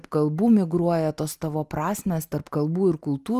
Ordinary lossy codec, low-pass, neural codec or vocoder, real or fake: Opus, 32 kbps; 14.4 kHz; none; real